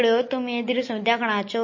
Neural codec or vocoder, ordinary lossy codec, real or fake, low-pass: none; MP3, 32 kbps; real; 7.2 kHz